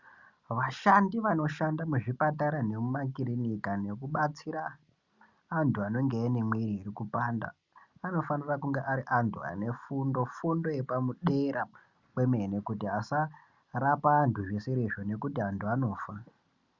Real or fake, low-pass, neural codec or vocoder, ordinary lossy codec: real; 7.2 kHz; none; Opus, 64 kbps